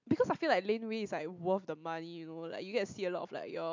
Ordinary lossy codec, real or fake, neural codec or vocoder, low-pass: MP3, 64 kbps; real; none; 7.2 kHz